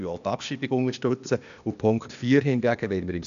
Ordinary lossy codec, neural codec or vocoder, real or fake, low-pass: none; codec, 16 kHz, 0.8 kbps, ZipCodec; fake; 7.2 kHz